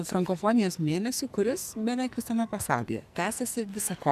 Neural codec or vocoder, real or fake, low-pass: codec, 44.1 kHz, 2.6 kbps, SNAC; fake; 14.4 kHz